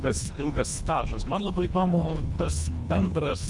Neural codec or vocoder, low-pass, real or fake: codec, 24 kHz, 1.5 kbps, HILCodec; 10.8 kHz; fake